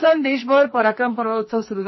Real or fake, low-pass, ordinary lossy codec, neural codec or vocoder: fake; 7.2 kHz; MP3, 24 kbps; codec, 44.1 kHz, 2.6 kbps, SNAC